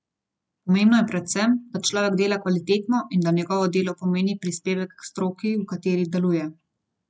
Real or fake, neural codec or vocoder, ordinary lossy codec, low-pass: real; none; none; none